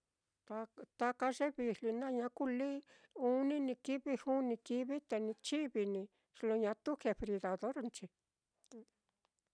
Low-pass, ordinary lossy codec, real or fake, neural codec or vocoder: 9.9 kHz; none; real; none